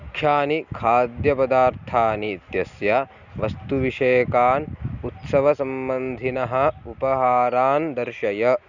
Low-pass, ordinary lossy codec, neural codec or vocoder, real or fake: 7.2 kHz; none; none; real